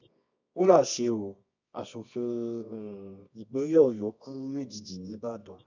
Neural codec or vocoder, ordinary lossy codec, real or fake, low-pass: codec, 24 kHz, 0.9 kbps, WavTokenizer, medium music audio release; none; fake; 7.2 kHz